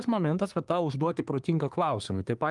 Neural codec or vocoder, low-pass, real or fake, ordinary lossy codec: codec, 24 kHz, 1 kbps, SNAC; 10.8 kHz; fake; Opus, 32 kbps